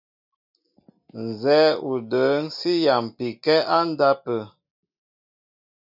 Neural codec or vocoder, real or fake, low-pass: none; real; 5.4 kHz